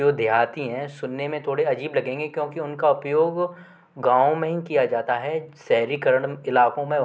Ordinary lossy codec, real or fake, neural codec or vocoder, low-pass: none; real; none; none